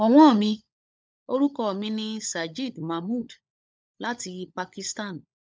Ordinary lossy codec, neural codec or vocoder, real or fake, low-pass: none; codec, 16 kHz, 16 kbps, FunCodec, trained on LibriTTS, 50 frames a second; fake; none